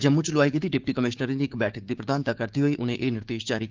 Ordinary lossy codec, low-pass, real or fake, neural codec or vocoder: Opus, 32 kbps; 7.2 kHz; fake; codec, 16 kHz, 6 kbps, DAC